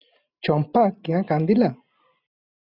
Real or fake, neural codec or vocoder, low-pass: real; none; 5.4 kHz